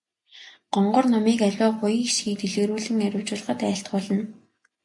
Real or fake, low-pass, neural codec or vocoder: real; 10.8 kHz; none